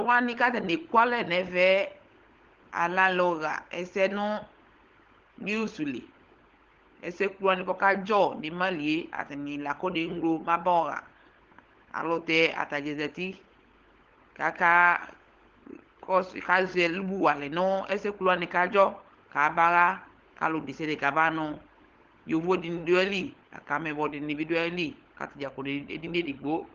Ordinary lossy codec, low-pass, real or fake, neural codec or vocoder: Opus, 16 kbps; 7.2 kHz; fake; codec, 16 kHz, 16 kbps, FunCodec, trained on LibriTTS, 50 frames a second